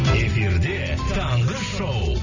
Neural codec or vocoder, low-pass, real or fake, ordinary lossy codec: none; 7.2 kHz; real; none